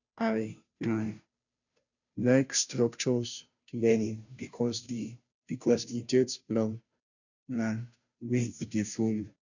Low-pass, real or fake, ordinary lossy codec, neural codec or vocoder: 7.2 kHz; fake; none; codec, 16 kHz, 0.5 kbps, FunCodec, trained on Chinese and English, 25 frames a second